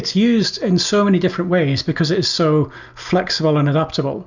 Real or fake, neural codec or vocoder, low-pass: real; none; 7.2 kHz